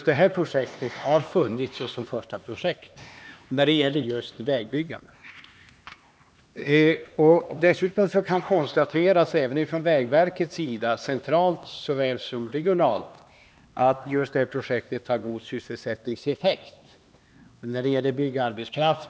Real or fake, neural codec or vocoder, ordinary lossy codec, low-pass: fake; codec, 16 kHz, 2 kbps, X-Codec, HuBERT features, trained on LibriSpeech; none; none